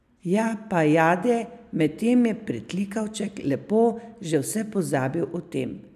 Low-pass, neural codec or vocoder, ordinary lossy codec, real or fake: 14.4 kHz; none; none; real